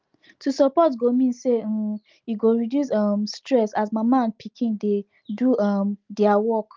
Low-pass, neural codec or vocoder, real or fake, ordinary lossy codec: 7.2 kHz; none; real; Opus, 32 kbps